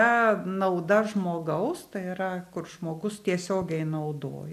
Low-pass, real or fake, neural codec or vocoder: 14.4 kHz; real; none